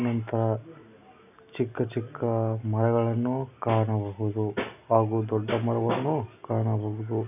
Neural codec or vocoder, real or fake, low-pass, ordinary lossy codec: none; real; 3.6 kHz; none